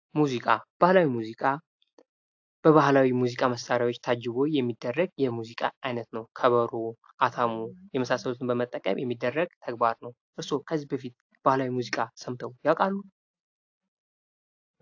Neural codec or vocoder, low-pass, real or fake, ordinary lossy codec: none; 7.2 kHz; real; AAC, 48 kbps